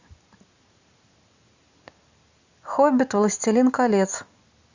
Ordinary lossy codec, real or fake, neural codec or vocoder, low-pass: Opus, 64 kbps; real; none; 7.2 kHz